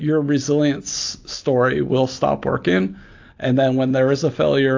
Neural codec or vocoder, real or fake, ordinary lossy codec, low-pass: none; real; AAC, 48 kbps; 7.2 kHz